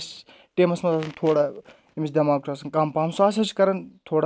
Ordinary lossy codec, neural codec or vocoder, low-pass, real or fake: none; none; none; real